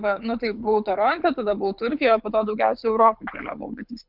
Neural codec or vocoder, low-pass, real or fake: codec, 24 kHz, 6 kbps, HILCodec; 5.4 kHz; fake